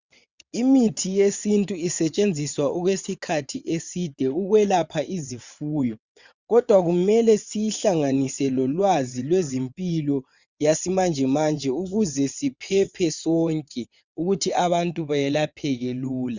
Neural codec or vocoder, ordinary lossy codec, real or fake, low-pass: vocoder, 22.05 kHz, 80 mel bands, WaveNeXt; Opus, 64 kbps; fake; 7.2 kHz